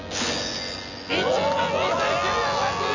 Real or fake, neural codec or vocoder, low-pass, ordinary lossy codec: fake; vocoder, 24 kHz, 100 mel bands, Vocos; 7.2 kHz; none